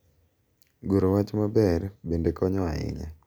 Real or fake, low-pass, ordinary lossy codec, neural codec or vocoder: real; none; none; none